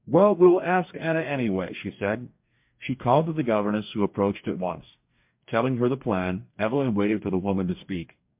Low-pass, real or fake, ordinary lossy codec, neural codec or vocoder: 3.6 kHz; fake; MP3, 32 kbps; codec, 44.1 kHz, 2.6 kbps, DAC